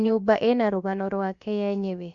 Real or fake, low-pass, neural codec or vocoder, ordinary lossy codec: fake; 7.2 kHz; codec, 16 kHz, about 1 kbps, DyCAST, with the encoder's durations; Opus, 64 kbps